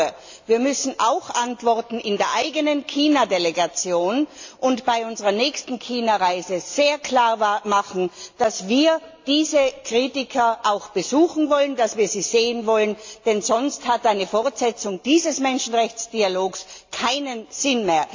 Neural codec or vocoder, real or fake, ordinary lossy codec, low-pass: none; real; AAC, 48 kbps; 7.2 kHz